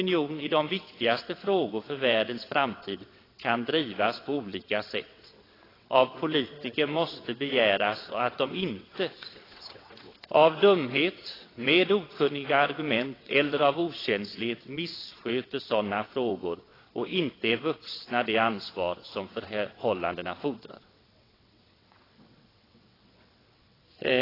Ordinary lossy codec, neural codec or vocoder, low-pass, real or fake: AAC, 24 kbps; vocoder, 22.05 kHz, 80 mel bands, WaveNeXt; 5.4 kHz; fake